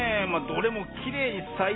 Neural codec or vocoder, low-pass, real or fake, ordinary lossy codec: none; 7.2 kHz; real; AAC, 16 kbps